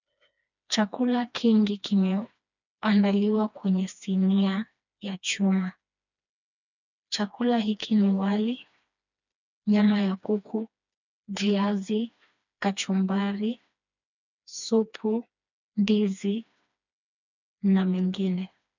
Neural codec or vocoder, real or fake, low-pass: codec, 16 kHz, 2 kbps, FreqCodec, smaller model; fake; 7.2 kHz